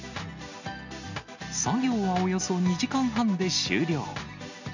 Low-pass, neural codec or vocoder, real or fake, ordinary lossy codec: 7.2 kHz; none; real; none